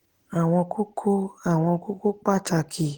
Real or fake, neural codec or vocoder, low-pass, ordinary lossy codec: real; none; 19.8 kHz; Opus, 16 kbps